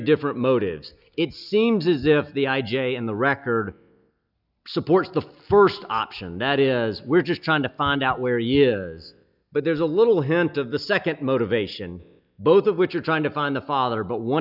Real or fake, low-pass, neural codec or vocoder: real; 5.4 kHz; none